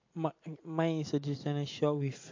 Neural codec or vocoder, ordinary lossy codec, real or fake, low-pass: none; MP3, 48 kbps; real; 7.2 kHz